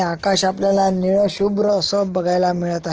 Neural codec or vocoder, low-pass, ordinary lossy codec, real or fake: none; 7.2 kHz; Opus, 16 kbps; real